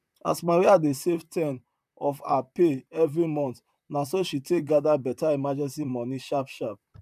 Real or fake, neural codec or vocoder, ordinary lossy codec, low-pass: fake; vocoder, 44.1 kHz, 128 mel bands, Pupu-Vocoder; none; 14.4 kHz